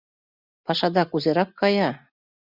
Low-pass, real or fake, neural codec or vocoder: 5.4 kHz; real; none